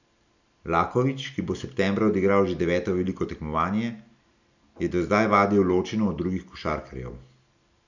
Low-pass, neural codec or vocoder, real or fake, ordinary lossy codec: 7.2 kHz; none; real; none